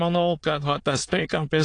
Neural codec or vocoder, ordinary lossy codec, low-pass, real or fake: autoencoder, 22.05 kHz, a latent of 192 numbers a frame, VITS, trained on many speakers; AAC, 48 kbps; 9.9 kHz; fake